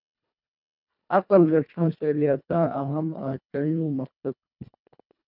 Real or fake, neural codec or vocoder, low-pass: fake; codec, 24 kHz, 1.5 kbps, HILCodec; 5.4 kHz